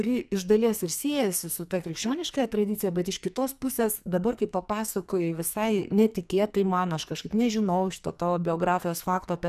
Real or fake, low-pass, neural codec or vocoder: fake; 14.4 kHz; codec, 44.1 kHz, 2.6 kbps, SNAC